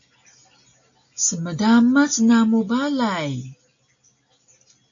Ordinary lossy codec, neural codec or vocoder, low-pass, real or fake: AAC, 64 kbps; none; 7.2 kHz; real